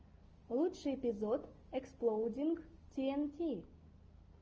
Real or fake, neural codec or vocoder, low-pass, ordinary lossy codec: real; none; 7.2 kHz; Opus, 24 kbps